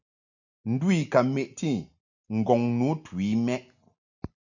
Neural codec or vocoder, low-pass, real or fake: none; 7.2 kHz; real